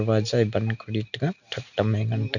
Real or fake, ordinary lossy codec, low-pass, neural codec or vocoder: real; none; 7.2 kHz; none